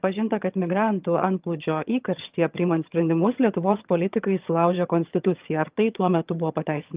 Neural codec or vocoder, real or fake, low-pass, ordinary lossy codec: vocoder, 22.05 kHz, 80 mel bands, HiFi-GAN; fake; 3.6 kHz; Opus, 24 kbps